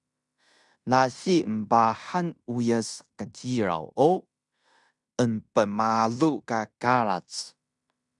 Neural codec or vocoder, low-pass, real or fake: codec, 16 kHz in and 24 kHz out, 0.9 kbps, LongCat-Audio-Codec, fine tuned four codebook decoder; 10.8 kHz; fake